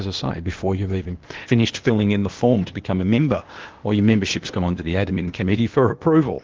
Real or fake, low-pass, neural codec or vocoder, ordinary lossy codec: fake; 7.2 kHz; codec, 16 kHz, 0.8 kbps, ZipCodec; Opus, 16 kbps